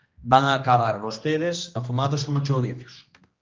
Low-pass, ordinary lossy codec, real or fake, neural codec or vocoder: 7.2 kHz; Opus, 24 kbps; fake; codec, 16 kHz, 1 kbps, X-Codec, HuBERT features, trained on general audio